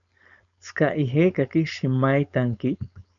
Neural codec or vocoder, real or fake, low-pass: codec, 16 kHz, 4.8 kbps, FACodec; fake; 7.2 kHz